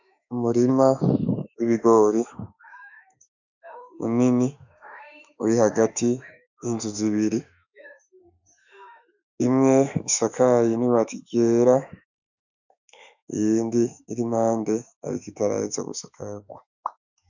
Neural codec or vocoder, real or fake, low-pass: autoencoder, 48 kHz, 32 numbers a frame, DAC-VAE, trained on Japanese speech; fake; 7.2 kHz